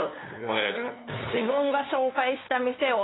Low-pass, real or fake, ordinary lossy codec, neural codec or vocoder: 7.2 kHz; fake; AAC, 16 kbps; codec, 16 kHz, 2 kbps, FunCodec, trained on LibriTTS, 25 frames a second